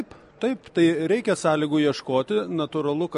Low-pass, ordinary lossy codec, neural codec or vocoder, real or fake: 14.4 kHz; MP3, 48 kbps; none; real